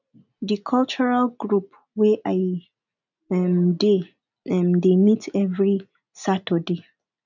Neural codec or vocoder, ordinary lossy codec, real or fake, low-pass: none; none; real; 7.2 kHz